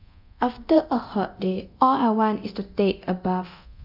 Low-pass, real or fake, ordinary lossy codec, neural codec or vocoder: 5.4 kHz; fake; none; codec, 24 kHz, 0.9 kbps, DualCodec